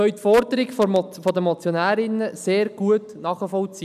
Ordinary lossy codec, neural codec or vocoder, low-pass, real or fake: none; none; 14.4 kHz; real